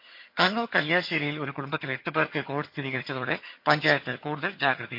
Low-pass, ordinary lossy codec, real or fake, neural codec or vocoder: 5.4 kHz; MP3, 32 kbps; fake; vocoder, 22.05 kHz, 80 mel bands, HiFi-GAN